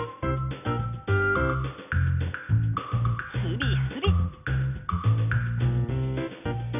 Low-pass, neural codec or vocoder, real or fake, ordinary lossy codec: 3.6 kHz; none; real; none